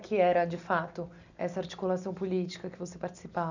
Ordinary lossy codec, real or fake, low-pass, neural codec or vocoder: none; real; 7.2 kHz; none